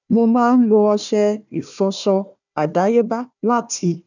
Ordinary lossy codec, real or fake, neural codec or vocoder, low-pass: none; fake; codec, 16 kHz, 1 kbps, FunCodec, trained on Chinese and English, 50 frames a second; 7.2 kHz